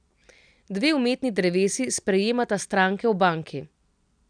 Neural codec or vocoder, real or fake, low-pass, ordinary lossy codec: none; real; 9.9 kHz; none